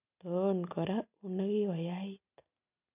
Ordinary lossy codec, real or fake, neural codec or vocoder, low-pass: none; real; none; 3.6 kHz